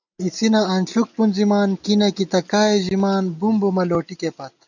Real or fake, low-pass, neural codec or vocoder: real; 7.2 kHz; none